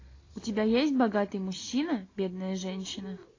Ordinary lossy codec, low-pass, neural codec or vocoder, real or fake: AAC, 32 kbps; 7.2 kHz; none; real